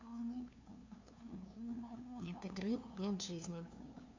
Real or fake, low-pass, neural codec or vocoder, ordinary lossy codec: fake; 7.2 kHz; codec, 16 kHz, 2 kbps, FunCodec, trained on LibriTTS, 25 frames a second; AAC, 48 kbps